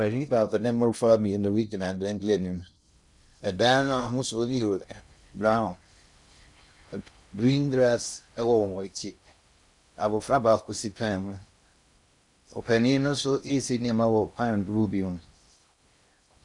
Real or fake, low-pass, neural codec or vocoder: fake; 10.8 kHz; codec, 16 kHz in and 24 kHz out, 0.6 kbps, FocalCodec, streaming, 2048 codes